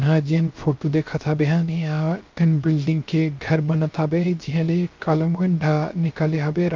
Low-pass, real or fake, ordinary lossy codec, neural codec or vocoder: 7.2 kHz; fake; Opus, 24 kbps; codec, 16 kHz, 0.3 kbps, FocalCodec